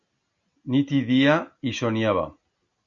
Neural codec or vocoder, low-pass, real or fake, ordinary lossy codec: none; 7.2 kHz; real; AAC, 64 kbps